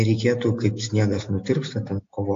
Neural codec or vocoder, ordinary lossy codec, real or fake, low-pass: none; AAC, 48 kbps; real; 7.2 kHz